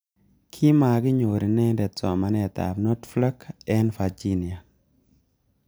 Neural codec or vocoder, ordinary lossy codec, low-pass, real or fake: none; none; none; real